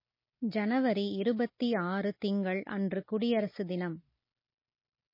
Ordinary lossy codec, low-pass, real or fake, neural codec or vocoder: MP3, 24 kbps; 5.4 kHz; real; none